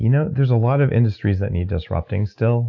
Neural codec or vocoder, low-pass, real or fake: none; 7.2 kHz; real